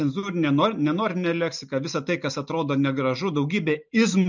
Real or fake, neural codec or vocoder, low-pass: real; none; 7.2 kHz